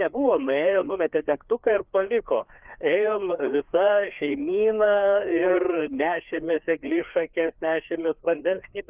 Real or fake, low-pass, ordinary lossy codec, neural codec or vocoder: fake; 3.6 kHz; Opus, 24 kbps; codec, 16 kHz, 2 kbps, FreqCodec, larger model